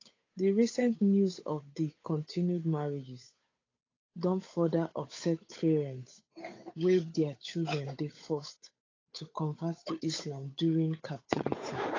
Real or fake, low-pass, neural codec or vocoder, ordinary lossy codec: fake; 7.2 kHz; codec, 16 kHz, 8 kbps, FunCodec, trained on Chinese and English, 25 frames a second; AAC, 32 kbps